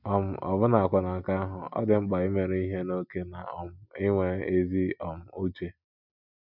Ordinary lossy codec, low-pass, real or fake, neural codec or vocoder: none; 5.4 kHz; real; none